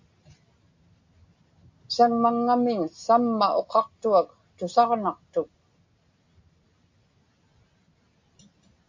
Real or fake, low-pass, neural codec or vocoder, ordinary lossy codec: real; 7.2 kHz; none; MP3, 48 kbps